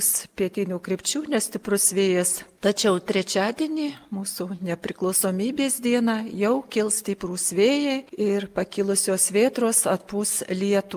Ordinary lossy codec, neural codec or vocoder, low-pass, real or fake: Opus, 32 kbps; none; 14.4 kHz; real